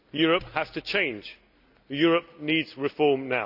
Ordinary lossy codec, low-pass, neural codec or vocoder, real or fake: none; 5.4 kHz; none; real